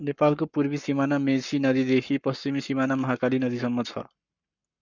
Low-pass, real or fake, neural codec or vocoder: 7.2 kHz; fake; codec, 44.1 kHz, 7.8 kbps, Pupu-Codec